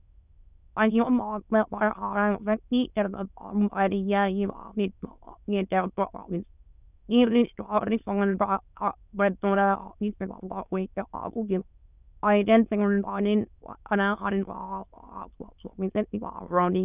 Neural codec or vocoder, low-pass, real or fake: autoencoder, 22.05 kHz, a latent of 192 numbers a frame, VITS, trained on many speakers; 3.6 kHz; fake